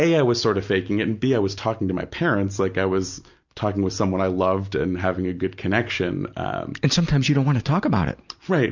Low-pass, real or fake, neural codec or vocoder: 7.2 kHz; real; none